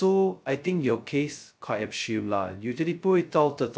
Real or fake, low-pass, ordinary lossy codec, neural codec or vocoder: fake; none; none; codec, 16 kHz, 0.2 kbps, FocalCodec